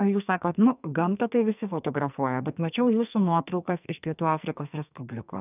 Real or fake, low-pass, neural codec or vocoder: fake; 3.6 kHz; codec, 44.1 kHz, 2.6 kbps, SNAC